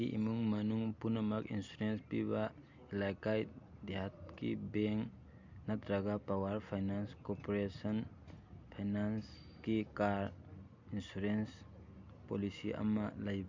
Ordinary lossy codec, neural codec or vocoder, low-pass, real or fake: MP3, 64 kbps; none; 7.2 kHz; real